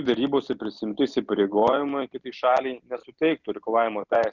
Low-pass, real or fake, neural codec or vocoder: 7.2 kHz; real; none